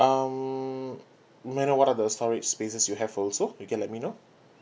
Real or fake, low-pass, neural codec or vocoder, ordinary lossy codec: real; none; none; none